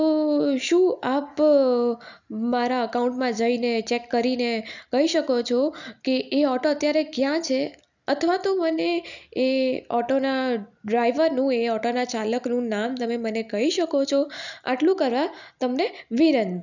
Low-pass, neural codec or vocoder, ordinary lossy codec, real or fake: 7.2 kHz; none; none; real